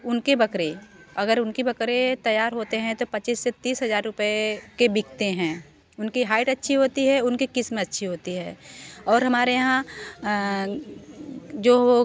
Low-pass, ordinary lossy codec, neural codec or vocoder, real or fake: none; none; none; real